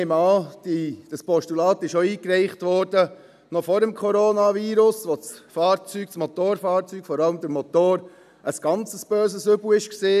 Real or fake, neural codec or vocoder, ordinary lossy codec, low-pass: real; none; none; 14.4 kHz